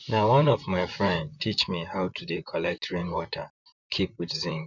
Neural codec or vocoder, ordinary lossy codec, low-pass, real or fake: vocoder, 44.1 kHz, 128 mel bands, Pupu-Vocoder; none; 7.2 kHz; fake